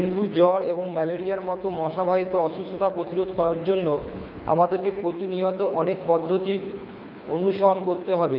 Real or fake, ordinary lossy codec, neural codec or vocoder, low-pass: fake; none; codec, 24 kHz, 3 kbps, HILCodec; 5.4 kHz